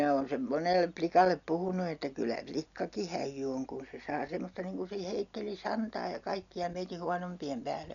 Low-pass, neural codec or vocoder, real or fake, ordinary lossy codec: 7.2 kHz; none; real; none